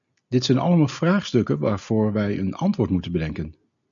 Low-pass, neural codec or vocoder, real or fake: 7.2 kHz; none; real